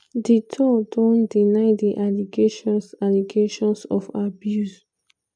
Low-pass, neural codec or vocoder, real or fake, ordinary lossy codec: 9.9 kHz; none; real; none